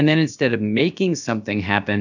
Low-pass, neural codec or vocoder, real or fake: 7.2 kHz; codec, 16 kHz, about 1 kbps, DyCAST, with the encoder's durations; fake